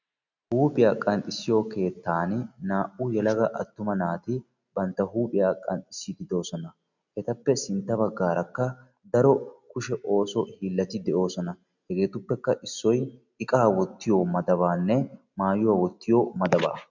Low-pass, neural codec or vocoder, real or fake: 7.2 kHz; none; real